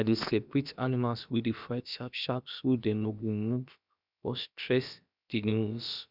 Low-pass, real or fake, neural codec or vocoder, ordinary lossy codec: 5.4 kHz; fake; codec, 16 kHz, about 1 kbps, DyCAST, with the encoder's durations; none